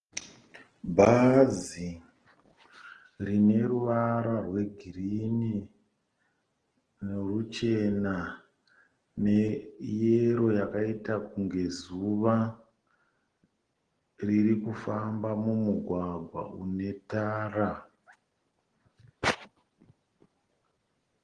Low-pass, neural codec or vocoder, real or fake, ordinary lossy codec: 9.9 kHz; none; real; Opus, 16 kbps